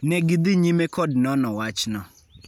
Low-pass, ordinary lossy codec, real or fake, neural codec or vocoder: 19.8 kHz; none; real; none